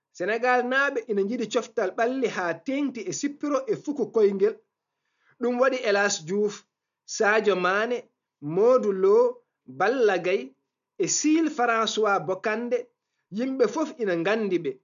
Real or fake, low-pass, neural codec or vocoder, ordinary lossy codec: real; 7.2 kHz; none; none